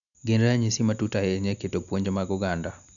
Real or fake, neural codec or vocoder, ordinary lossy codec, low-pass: real; none; none; 7.2 kHz